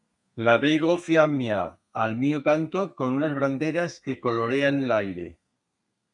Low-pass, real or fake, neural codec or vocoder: 10.8 kHz; fake; codec, 32 kHz, 1.9 kbps, SNAC